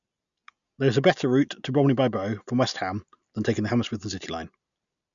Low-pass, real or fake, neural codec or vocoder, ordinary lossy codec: 7.2 kHz; real; none; none